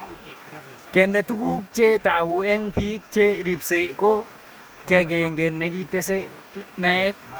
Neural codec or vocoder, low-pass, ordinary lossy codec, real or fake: codec, 44.1 kHz, 2.6 kbps, DAC; none; none; fake